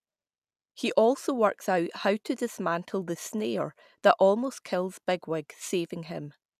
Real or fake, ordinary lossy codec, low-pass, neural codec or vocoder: real; none; 14.4 kHz; none